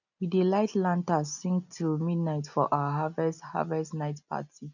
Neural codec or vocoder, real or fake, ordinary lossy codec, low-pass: none; real; none; 7.2 kHz